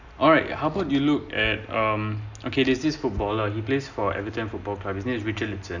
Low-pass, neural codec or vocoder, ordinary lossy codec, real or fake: 7.2 kHz; none; none; real